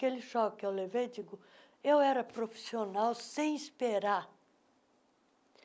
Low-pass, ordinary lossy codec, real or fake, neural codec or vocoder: none; none; real; none